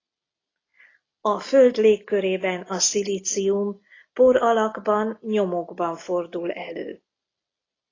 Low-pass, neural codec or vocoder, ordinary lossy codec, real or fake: 7.2 kHz; none; AAC, 32 kbps; real